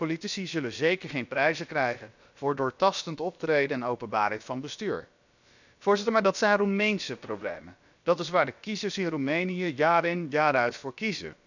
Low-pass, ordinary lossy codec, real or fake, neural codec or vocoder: 7.2 kHz; none; fake; codec, 16 kHz, about 1 kbps, DyCAST, with the encoder's durations